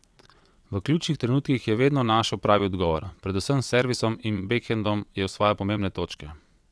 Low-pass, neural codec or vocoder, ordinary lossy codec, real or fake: none; vocoder, 22.05 kHz, 80 mel bands, WaveNeXt; none; fake